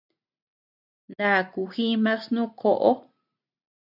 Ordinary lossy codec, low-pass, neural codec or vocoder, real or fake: AAC, 48 kbps; 5.4 kHz; none; real